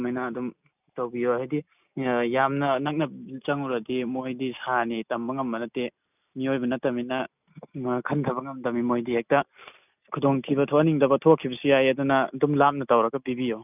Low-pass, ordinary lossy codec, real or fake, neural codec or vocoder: 3.6 kHz; none; real; none